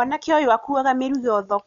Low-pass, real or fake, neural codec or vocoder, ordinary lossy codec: 7.2 kHz; real; none; none